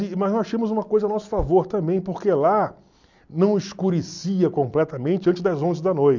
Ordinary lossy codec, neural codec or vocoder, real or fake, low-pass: none; none; real; 7.2 kHz